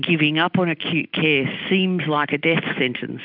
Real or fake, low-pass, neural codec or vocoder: real; 5.4 kHz; none